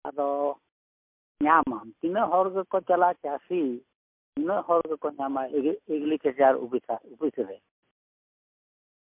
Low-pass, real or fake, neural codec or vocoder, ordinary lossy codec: 3.6 kHz; real; none; MP3, 32 kbps